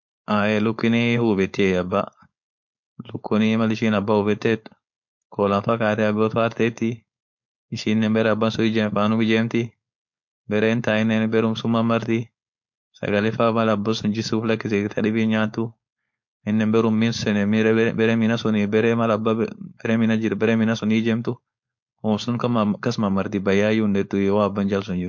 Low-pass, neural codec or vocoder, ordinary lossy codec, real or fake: 7.2 kHz; codec, 16 kHz, 4.8 kbps, FACodec; MP3, 48 kbps; fake